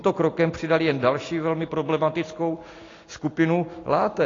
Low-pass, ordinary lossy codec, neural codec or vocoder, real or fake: 7.2 kHz; AAC, 32 kbps; none; real